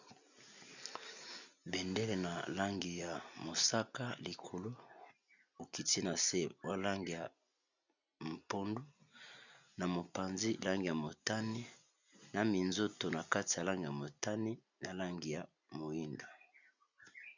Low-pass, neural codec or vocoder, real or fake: 7.2 kHz; none; real